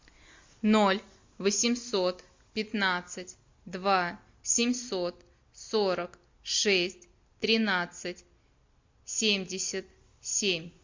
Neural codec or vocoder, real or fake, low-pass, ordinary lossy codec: none; real; 7.2 kHz; MP3, 48 kbps